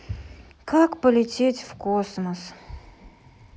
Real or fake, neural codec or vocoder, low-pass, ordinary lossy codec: real; none; none; none